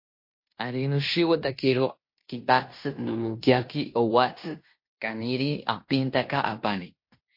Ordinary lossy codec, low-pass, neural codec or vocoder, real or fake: MP3, 32 kbps; 5.4 kHz; codec, 16 kHz in and 24 kHz out, 0.9 kbps, LongCat-Audio-Codec, fine tuned four codebook decoder; fake